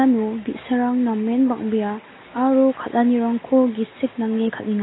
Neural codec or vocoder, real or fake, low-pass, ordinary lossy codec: none; real; 7.2 kHz; AAC, 16 kbps